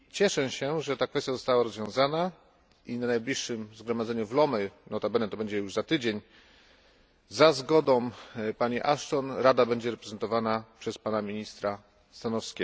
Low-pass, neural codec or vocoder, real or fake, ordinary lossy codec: none; none; real; none